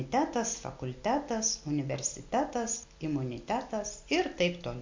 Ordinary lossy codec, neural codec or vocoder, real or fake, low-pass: MP3, 48 kbps; none; real; 7.2 kHz